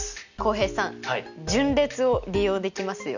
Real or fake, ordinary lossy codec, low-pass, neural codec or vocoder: real; none; 7.2 kHz; none